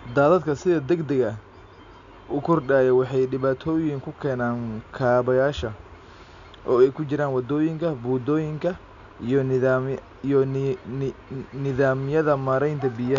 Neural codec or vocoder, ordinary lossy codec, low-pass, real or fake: none; none; 7.2 kHz; real